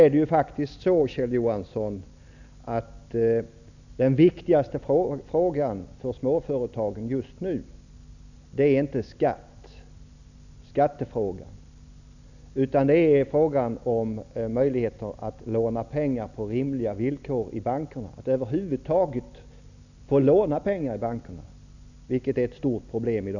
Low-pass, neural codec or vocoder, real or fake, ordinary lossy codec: 7.2 kHz; none; real; none